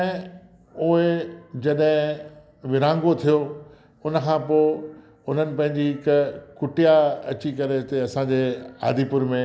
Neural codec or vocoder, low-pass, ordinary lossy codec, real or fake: none; none; none; real